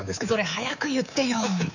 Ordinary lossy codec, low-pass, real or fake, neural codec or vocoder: none; 7.2 kHz; fake; codec, 24 kHz, 3.1 kbps, DualCodec